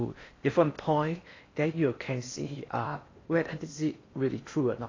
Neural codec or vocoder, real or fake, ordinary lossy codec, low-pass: codec, 16 kHz in and 24 kHz out, 0.6 kbps, FocalCodec, streaming, 4096 codes; fake; AAC, 32 kbps; 7.2 kHz